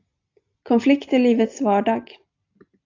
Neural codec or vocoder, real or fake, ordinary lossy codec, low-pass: none; real; AAC, 48 kbps; 7.2 kHz